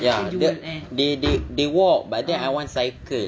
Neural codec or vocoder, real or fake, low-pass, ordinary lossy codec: none; real; none; none